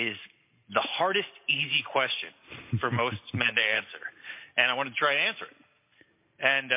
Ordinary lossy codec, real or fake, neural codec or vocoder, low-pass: MP3, 24 kbps; real; none; 3.6 kHz